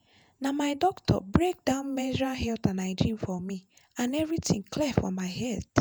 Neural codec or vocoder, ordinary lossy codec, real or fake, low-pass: vocoder, 48 kHz, 128 mel bands, Vocos; none; fake; none